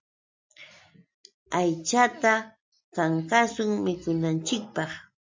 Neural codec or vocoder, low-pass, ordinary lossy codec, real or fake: none; 7.2 kHz; MP3, 64 kbps; real